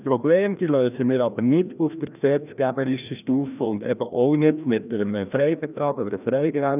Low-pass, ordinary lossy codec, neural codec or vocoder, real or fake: 3.6 kHz; none; codec, 16 kHz, 1 kbps, FreqCodec, larger model; fake